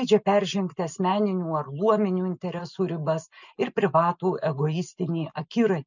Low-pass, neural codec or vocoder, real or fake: 7.2 kHz; none; real